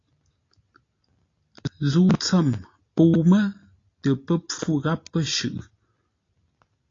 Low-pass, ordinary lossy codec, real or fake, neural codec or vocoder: 7.2 kHz; AAC, 32 kbps; real; none